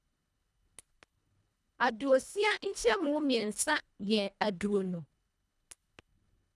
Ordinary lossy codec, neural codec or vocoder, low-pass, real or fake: none; codec, 24 kHz, 1.5 kbps, HILCodec; none; fake